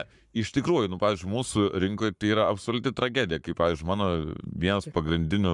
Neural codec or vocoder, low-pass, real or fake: codec, 44.1 kHz, 7.8 kbps, Pupu-Codec; 10.8 kHz; fake